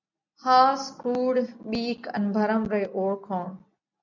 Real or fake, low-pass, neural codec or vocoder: real; 7.2 kHz; none